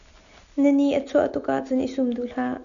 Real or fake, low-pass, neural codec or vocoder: real; 7.2 kHz; none